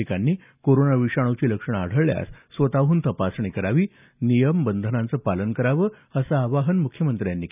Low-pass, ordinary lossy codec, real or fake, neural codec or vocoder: 3.6 kHz; none; real; none